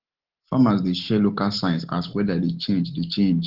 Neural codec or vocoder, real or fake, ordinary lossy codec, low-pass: none; real; Opus, 16 kbps; 5.4 kHz